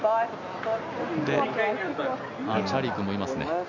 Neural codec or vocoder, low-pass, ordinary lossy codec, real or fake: none; 7.2 kHz; none; real